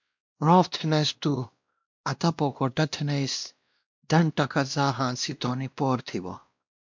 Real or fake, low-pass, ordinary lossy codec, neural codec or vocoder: fake; 7.2 kHz; MP3, 64 kbps; codec, 16 kHz, 1 kbps, X-Codec, WavLM features, trained on Multilingual LibriSpeech